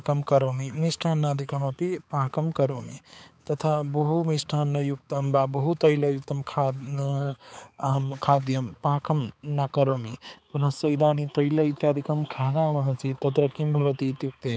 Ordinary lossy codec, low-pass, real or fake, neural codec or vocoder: none; none; fake; codec, 16 kHz, 4 kbps, X-Codec, HuBERT features, trained on balanced general audio